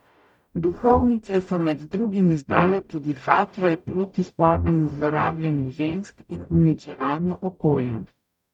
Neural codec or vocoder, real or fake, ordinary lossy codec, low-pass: codec, 44.1 kHz, 0.9 kbps, DAC; fake; none; 19.8 kHz